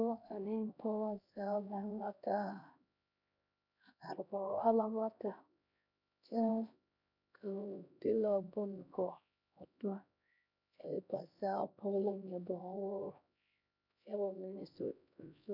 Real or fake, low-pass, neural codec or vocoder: fake; 5.4 kHz; codec, 16 kHz, 1 kbps, X-Codec, HuBERT features, trained on LibriSpeech